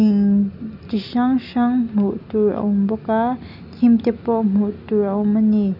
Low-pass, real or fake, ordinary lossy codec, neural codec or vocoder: 5.4 kHz; real; none; none